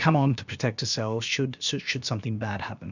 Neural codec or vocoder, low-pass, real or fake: codec, 16 kHz, about 1 kbps, DyCAST, with the encoder's durations; 7.2 kHz; fake